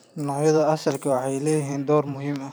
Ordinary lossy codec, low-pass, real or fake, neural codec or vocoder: none; none; fake; vocoder, 44.1 kHz, 128 mel bands every 512 samples, BigVGAN v2